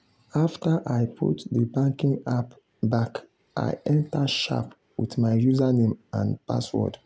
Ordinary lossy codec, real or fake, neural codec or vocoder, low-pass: none; real; none; none